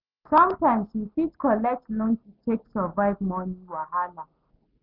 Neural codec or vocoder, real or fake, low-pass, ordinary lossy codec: none; real; 5.4 kHz; none